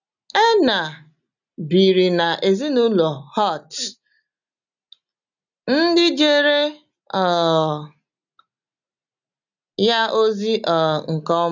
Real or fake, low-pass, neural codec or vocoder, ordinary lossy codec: real; 7.2 kHz; none; none